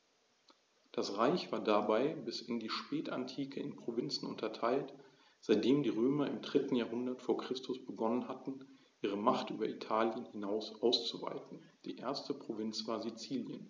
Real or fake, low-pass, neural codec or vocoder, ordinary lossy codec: real; none; none; none